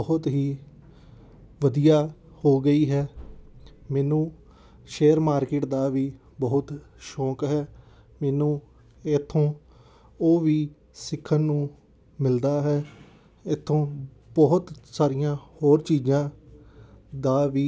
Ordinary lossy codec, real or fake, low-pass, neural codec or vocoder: none; real; none; none